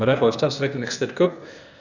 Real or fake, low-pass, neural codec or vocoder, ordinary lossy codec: fake; 7.2 kHz; codec, 16 kHz, 0.8 kbps, ZipCodec; none